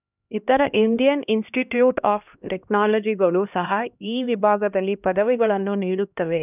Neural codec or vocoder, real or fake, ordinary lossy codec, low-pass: codec, 16 kHz, 1 kbps, X-Codec, HuBERT features, trained on LibriSpeech; fake; none; 3.6 kHz